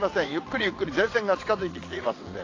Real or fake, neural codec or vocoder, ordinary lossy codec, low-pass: fake; vocoder, 22.05 kHz, 80 mel bands, WaveNeXt; AAC, 32 kbps; 7.2 kHz